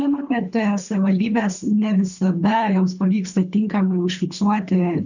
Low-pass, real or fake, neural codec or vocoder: 7.2 kHz; fake; codec, 24 kHz, 3 kbps, HILCodec